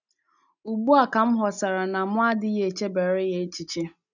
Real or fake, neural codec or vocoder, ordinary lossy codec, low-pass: real; none; none; 7.2 kHz